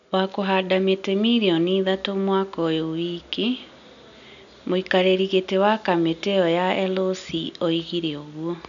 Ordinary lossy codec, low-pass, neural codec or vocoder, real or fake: none; 7.2 kHz; none; real